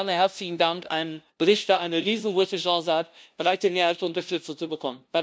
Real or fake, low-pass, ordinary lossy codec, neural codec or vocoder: fake; none; none; codec, 16 kHz, 0.5 kbps, FunCodec, trained on LibriTTS, 25 frames a second